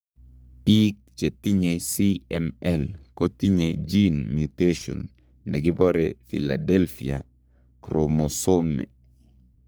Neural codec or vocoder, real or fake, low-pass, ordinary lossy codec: codec, 44.1 kHz, 3.4 kbps, Pupu-Codec; fake; none; none